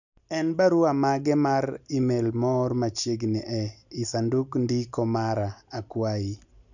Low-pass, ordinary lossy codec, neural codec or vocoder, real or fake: 7.2 kHz; none; none; real